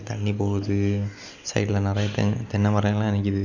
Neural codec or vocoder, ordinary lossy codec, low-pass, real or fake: none; none; 7.2 kHz; real